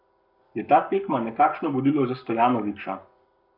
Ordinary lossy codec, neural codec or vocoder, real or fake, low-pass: none; codec, 44.1 kHz, 7.8 kbps, Pupu-Codec; fake; 5.4 kHz